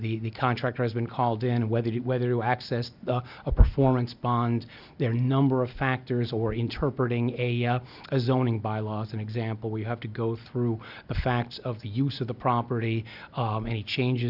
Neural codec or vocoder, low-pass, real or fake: none; 5.4 kHz; real